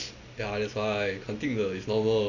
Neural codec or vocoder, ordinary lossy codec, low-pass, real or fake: none; none; 7.2 kHz; real